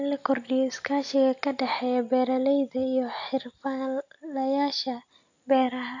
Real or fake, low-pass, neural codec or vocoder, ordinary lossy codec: real; 7.2 kHz; none; AAC, 48 kbps